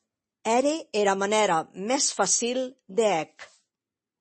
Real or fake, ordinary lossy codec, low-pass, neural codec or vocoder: real; MP3, 32 kbps; 10.8 kHz; none